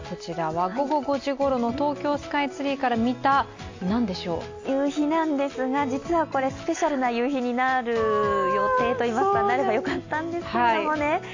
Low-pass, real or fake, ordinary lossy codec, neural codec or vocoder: 7.2 kHz; real; AAC, 48 kbps; none